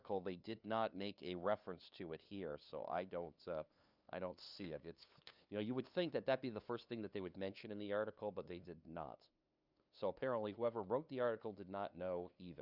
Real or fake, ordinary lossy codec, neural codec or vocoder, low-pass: fake; Opus, 64 kbps; codec, 16 kHz, 2 kbps, FunCodec, trained on LibriTTS, 25 frames a second; 5.4 kHz